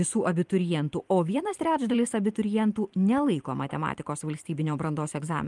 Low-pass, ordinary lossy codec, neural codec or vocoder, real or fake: 10.8 kHz; Opus, 32 kbps; vocoder, 44.1 kHz, 128 mel bands every 512 samples, BigVGAN v2; fake